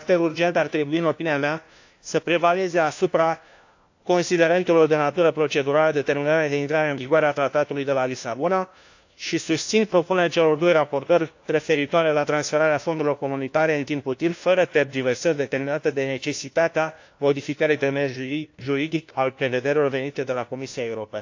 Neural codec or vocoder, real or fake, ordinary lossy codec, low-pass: codec, 16 kHz, 1 kbps, FunCodec, trained on LibriTTS, 50 frames a second; fake; AAC, 48 kbps; 7.2 kHz